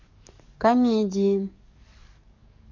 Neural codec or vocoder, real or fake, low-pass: codec, 44.1 kHz, 7.8 kbps, DAC; fake; 7.2 kHz